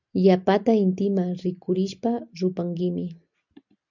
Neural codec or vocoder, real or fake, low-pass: none; real; 7.2 kHz